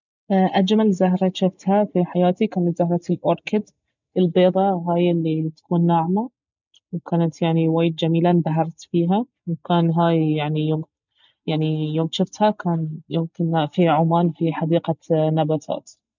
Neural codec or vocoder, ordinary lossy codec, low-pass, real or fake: none; none; 7.2 kHz; real